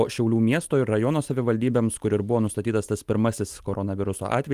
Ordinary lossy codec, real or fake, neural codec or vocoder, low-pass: Opus, 32 kbps; real; none; 14.4 kHz